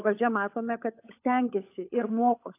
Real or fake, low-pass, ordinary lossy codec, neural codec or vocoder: fake; 3.6 kHz; AAC, 24 kbps; codec, 16 kHz, 16 kbps, FunCodec, trained on LibriTTS, 50 frames a second